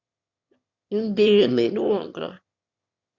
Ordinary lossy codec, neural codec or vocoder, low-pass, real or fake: Opus, 64 kbps; autoencoder, 22.05 kHz, a latent of 192 numbers a frame, VITS, trained on one speaker; 7.2 kHz; fake